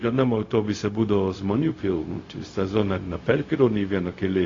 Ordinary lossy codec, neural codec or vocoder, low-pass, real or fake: AAC, 32 kbps; codec, 16 kHz, 0.4 kbps, LongCat-Audio-Codec; 7.2 kHz; fake